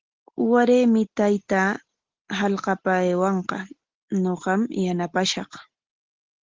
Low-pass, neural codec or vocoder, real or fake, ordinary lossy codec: 7.2 kHz; none; real; Opus, 16 kbps